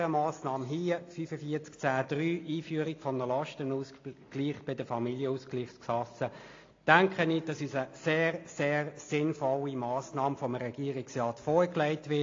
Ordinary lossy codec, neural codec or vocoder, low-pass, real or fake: AAC, 32 kbps; none; 7.2 kHz; real